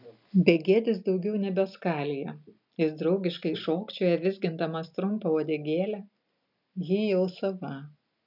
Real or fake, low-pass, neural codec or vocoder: real; 5.4 kHz; none